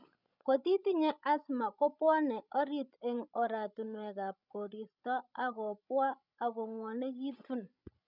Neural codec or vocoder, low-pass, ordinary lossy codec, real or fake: codec, 16 kHz, 16 kbps, FreqCodec, larger model; 5.4 kHz; none; fake